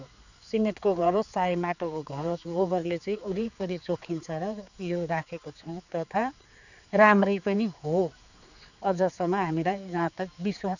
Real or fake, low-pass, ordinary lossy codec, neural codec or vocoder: fake; 7.2 kHz; none; codec, 16 kHz, 4 kbps, X-Codec, HuBERT features, trained on general audio